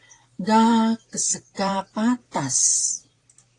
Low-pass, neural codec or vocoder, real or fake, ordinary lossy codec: 10.8 kHz; vocoder, 44.1 kHz, 128 mel bands, Pupu-Vocoder; fake; AAC, 48 kbps